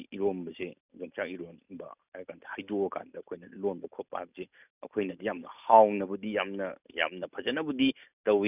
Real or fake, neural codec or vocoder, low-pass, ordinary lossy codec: real; none; 3.6 kHz; none